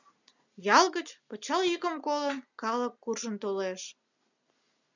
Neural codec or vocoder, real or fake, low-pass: none; real; 7.2 kHz